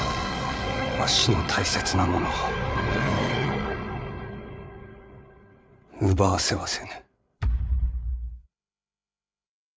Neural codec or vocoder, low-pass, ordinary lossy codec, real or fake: codec, 16 kHz, 8 kbps, FreqCodec, larger model; none; none; fake